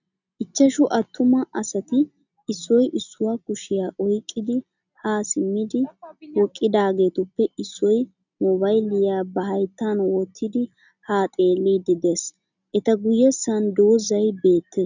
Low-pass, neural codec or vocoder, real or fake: 7.2 kHz; none; real